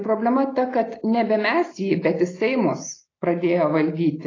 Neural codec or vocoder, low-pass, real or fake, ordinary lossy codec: none; 7.2 kHz; real; AAC, 32 kbps